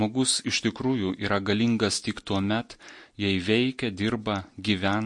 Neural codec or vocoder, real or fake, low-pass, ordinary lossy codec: none; real; 10.8 kHz; MP3, 48 kbps